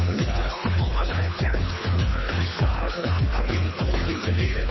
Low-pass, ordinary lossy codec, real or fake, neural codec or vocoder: 7.2 kHz; MP3, 24 kbps; fake; codec, 24 kHz, 3 kbps, HILCodec